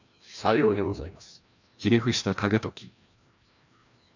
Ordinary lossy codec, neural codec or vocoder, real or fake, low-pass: none; codec, 16 kHz, 1 kbps, FreqCodec, larger model; fake; 7.2 kHz